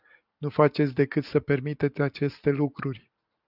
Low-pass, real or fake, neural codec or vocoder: 5.4 kHz; real; none